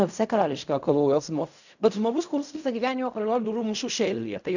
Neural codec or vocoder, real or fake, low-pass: codec, 16 kHz in and 24 kHz out, 0.4 kbps, LongCat-Audio-Codec, fine tuned four codebook decoder; fake; 7.2 kHz